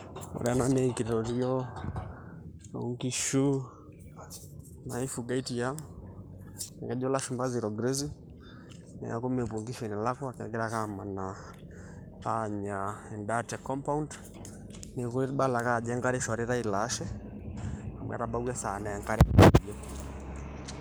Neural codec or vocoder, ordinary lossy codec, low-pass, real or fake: codec, 44.1 kHz, 7.8 kbps, Pupu-Codec; none; none; fake